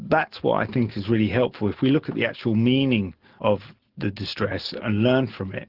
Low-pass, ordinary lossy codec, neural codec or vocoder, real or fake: 5.4 kHz; Opus, 16 kbps; none; real